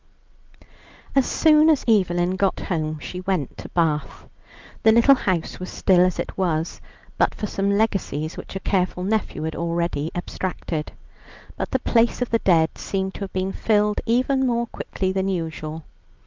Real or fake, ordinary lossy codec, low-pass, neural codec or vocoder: real; Opus, 24 kbps; 7.2 kHz; none